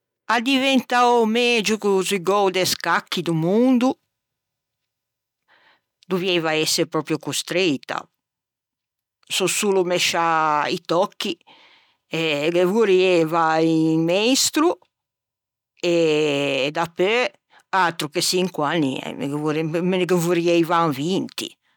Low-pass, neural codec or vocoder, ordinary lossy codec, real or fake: 19.8 kHz; none; none; real